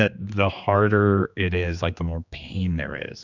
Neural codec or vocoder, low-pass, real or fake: codec, 16 kHz, 2 kbps, X-Codec, HuBERT features, trained on general audio; 7.2 kHz; fake